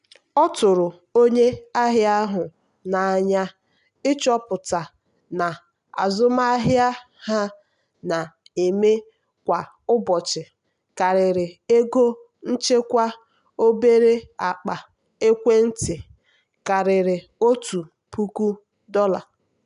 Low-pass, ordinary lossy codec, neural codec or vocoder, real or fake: 10.8 kHz; none; none; real